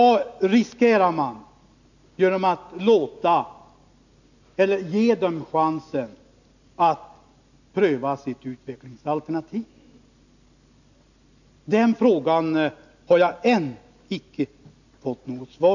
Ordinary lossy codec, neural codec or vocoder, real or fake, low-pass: none; none; real; 7.2 kHz